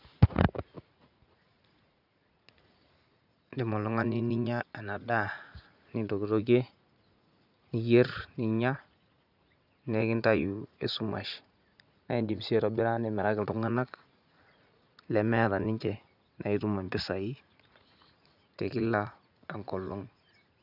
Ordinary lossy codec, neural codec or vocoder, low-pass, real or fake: none; vocoder, 44.1 kHz, 80 mel bands, Vocos; 5.4 kHz; fake